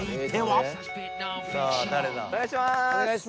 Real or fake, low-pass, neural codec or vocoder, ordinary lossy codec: real; none; none; none